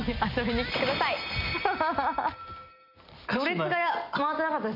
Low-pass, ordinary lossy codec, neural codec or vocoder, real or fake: 5.4 kHz; none; none; real